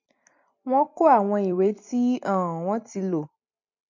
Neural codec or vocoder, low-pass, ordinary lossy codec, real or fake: vocoder, 44.1 kHz, 128 mel bands every 256 samples, BigVGAN v2; 7.2 kHz; MP3, 48 kbps; fake